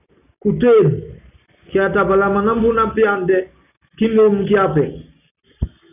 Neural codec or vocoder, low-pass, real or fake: none; 3.6 kHz; real